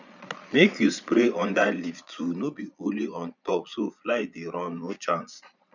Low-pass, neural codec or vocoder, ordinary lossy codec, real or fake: 7.2 kHz; vocoder, 22.05 kHz, 80 mel bands, Vocos; none; fake